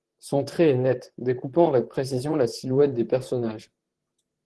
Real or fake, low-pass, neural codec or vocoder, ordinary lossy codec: fake; 10.8 kHz; vocoder, 44.1 kHz, 128 mel bands, Pupu-Vocoder; Opus, 16 kbps